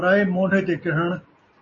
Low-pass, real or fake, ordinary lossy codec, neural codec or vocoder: 7.2 kHz; real; MP3, 32 kbps; none